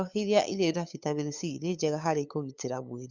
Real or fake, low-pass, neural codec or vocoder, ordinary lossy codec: fake; 7.2 kHz; codec, 16 kHz, 8 kbps, FunCodec, trained on LibriTTS, 25 frames a second; Opus, 64 kbps